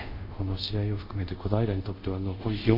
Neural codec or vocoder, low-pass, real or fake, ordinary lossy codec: codec, 24 kHz, 0.5 kbps, DualCodec; 5.4 kHz; fake; none